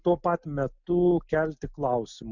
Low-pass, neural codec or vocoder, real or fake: 7.2 kHz; none; real